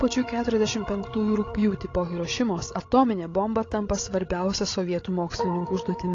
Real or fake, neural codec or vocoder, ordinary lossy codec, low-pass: fake; codec, 16 kHz, 16 kbps, FreqCodec, larger model; AAC, 32 kbps; 7.2 kHz